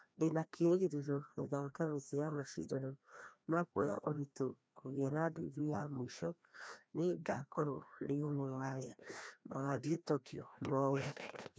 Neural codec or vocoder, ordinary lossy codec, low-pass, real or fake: codec, 16 kHz, 1 kbps, FreqCodec, larger model; none; none; fake